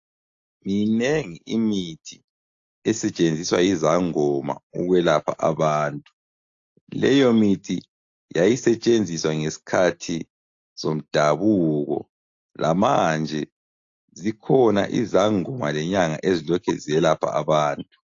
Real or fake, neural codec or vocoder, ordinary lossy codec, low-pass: real; none; AAC, 48 kbps; 7.2 kHz